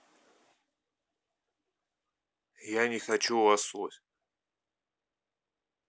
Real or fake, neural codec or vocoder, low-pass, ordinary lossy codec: real; none; none; none